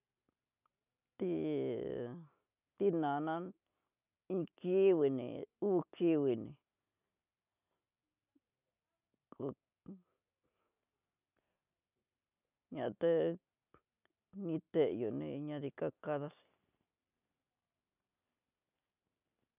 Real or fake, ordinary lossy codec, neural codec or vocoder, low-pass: real; none; none; 3.6 kHz